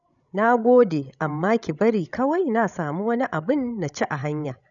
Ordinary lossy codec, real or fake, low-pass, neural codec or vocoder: none; fake; 7.2 kHz; codec, 16 kHz, 16 kbps, FreqCodec, larger model